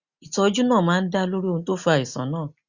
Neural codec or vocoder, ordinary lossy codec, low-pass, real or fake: none; Opus, 64 kbps; 7.2 kHz; real